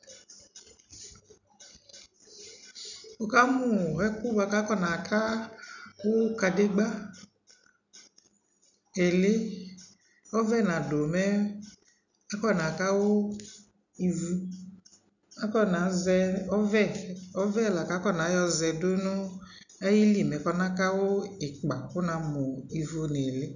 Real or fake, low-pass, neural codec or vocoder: real; 7.2 kHz; none